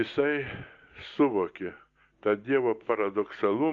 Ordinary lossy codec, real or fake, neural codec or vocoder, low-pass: Opus, 32 kbps; real; none; 7.2 kHz